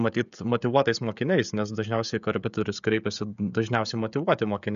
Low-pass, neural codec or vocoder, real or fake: 7.2 kHz; codec, 16 kHz, 16 kbps, FreqCodec, smaller model; fake